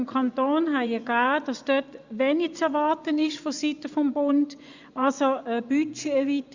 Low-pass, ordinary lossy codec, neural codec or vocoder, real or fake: 7.2 kHz; Opus, 64 kbps; vocoder, 24 kHz, 100 mel bands, Vocos; fake